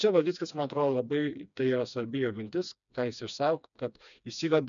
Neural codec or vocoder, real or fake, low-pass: codec, 16 kHz, 2 kbps, FreqCodec, smaller model; fake; 7.2 kHz